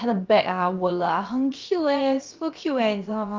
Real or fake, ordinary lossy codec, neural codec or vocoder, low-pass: fake; Opus, 24 kbps; codec, 16 kHz, about 1 kbps, DyCAST, with the encoder's durations; 7.2 kHz